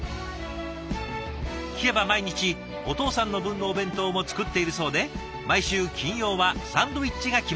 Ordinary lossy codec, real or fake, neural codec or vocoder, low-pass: none; real; none; none